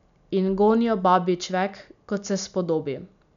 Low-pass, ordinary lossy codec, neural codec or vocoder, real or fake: 7.2 kHz; none; none; real